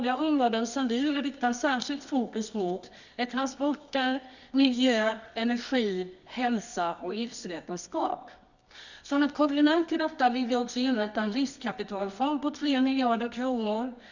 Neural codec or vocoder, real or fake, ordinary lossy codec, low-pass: codec, 24 kHz, 0.9 kbps, WavTokenizer, medium music audio release; fake; none; 7.2 kHz